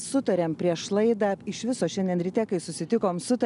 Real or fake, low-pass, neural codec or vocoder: real; 10.8 kHz; none